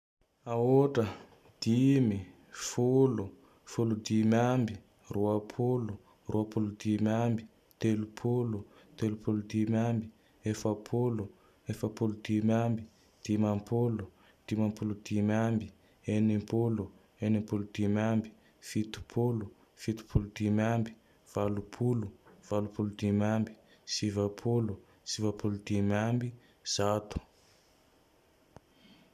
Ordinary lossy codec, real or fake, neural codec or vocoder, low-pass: none; real; none; 14.4 kHz